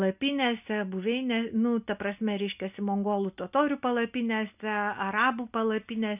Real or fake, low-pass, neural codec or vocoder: real; 3.6 kHz; none